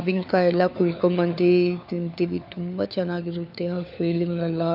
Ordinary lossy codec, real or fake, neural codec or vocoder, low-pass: none; fake; codec, 16 kHz, 4 kbps, FunCodec, trained on LibriTTS, 50 frames a second; 5.4 kHz